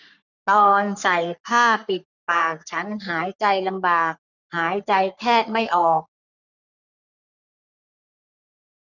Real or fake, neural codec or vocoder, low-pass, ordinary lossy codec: fake; codec, 44.1 kHz, 3.4 kbps, Pupu-Codec; 7.2 kHz; none